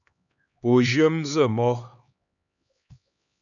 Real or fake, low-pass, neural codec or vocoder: fake; 7.2 kHz; codec, 16 kHz, 2 kbps, X-Codec, HuBERT features, trained on LibriSpeech